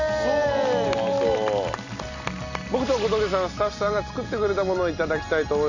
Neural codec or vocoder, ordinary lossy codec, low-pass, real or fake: none; none; 7.2 kHz; real